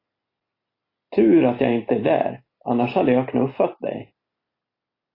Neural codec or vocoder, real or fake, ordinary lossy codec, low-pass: none; real; AAC, 24 kbps; 5.4 kHz